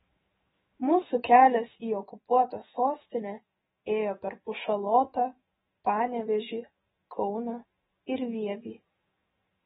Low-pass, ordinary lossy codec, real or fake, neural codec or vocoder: 19.8 kHz; AAC, 16 kbps; fake; autoencoder, 48 kHz, 128 numbers a frame, DAC-VAE, trained on Japanese speech